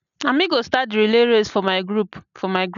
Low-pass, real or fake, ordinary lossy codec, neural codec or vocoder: 7.2 kHz; real; none; none